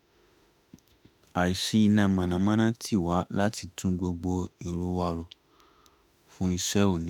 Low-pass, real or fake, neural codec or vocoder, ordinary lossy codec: 19.8 kHz; fake; autoencoder, 48 kHz, 32 numbers a frame, DAC-VAE, trained on Japanese speech; none